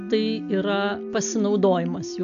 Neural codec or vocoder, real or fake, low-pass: none; real; 7.2 kHz